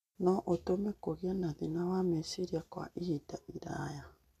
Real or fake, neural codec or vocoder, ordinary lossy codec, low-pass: real; none; none; 14.4 kHz